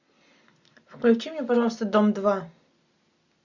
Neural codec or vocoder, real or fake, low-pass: none; real; 7.2 kHz